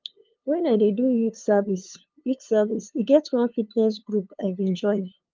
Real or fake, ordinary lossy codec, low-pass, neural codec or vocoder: fake; Opus, 24 kbps; 7.2 kHz; codec, 16 kHz, 2 kbps, FunCodec, trained on LibriTTS, 25 frames a second